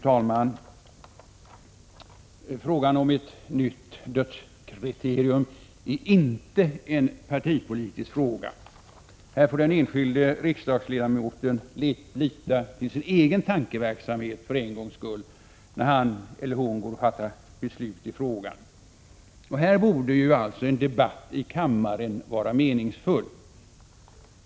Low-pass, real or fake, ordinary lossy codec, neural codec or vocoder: none; real; none; none